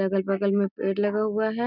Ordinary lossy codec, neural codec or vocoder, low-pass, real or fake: none; none; 5.4 kHz; real